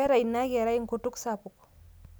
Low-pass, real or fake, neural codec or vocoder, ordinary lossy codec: none; real; none; none